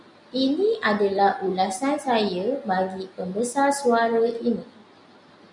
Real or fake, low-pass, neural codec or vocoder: real; 10.8 kHz; none